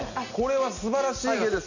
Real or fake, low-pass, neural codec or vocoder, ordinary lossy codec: real; 7.2 kHz; none; none